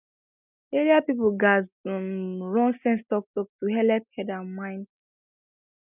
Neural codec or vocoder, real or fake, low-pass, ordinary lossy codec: none; real; 3.6 kHz; none